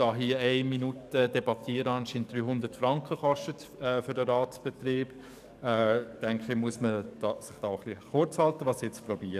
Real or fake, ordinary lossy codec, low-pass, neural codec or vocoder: fake; none; 14.4 kHz; codec, 44.1 kHz, 7.8 kbps, DAC